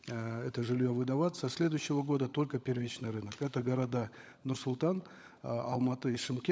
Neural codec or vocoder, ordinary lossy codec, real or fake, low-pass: codec, 16 kHz, 16 kbps, FunCodec, trained on LibriTTS, 50 frames a second; none; fake; none